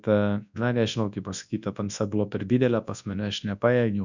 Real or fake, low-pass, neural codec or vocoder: fake; 7.2 kHz; codec, 24 kHz, 0.9 kbps, WavTokenizer, large speech release